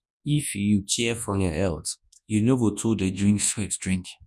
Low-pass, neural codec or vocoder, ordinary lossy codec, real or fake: none; codec, 24 kHz, 0.9 kbps, WavTokenizer, large speech release; none; fake